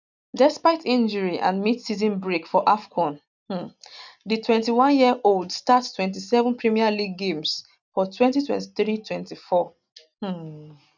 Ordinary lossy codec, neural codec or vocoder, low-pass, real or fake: none; none; 7.2 kHz; real